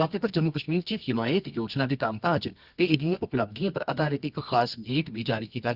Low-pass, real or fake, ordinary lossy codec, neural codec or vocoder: 5.4 kHz; fake; Opus, 64 kbps; codec, 24 kHz, 0.9 kbps, WavTokenizer, medium music audio release